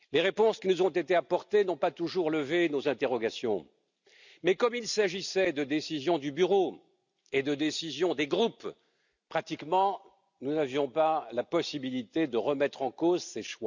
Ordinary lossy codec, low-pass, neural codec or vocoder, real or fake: none; 7.2 kHz; none; real